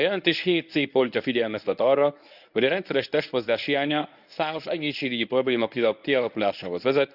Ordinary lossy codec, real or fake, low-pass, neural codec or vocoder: none; fake; 5.4 kHz; codec, 24 kHz, 0.9 kbps, WavTokenizer, medium speech release version 1